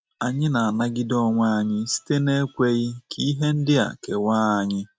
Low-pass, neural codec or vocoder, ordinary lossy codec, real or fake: none; none; none; real